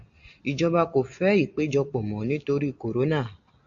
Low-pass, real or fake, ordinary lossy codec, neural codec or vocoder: 7.2 kHz; real; AAC, 64 kbps; none